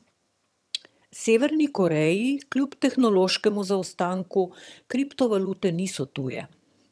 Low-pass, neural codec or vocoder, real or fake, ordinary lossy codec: none; vocoder, 22.05 kHz, 80 mel bands, HiFi-GAN; fake; none